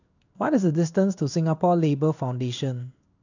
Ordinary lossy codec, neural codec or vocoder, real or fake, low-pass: none; codec, 16 kHz in and 24 kHz out, 1 kbps, XY-Tokenizer; fake; 7.2 kHz